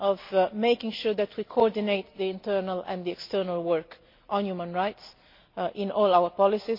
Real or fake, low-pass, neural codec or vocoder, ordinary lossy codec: real; 5.4 kHz; none; MP3, 32 kbps